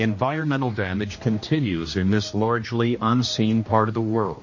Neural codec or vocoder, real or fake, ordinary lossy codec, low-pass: codec, 16 kHz, 1 kbps, X-Codec, HuBERT features, trained on general audio; fake; MP3, 32 kbps; 7.2 kHz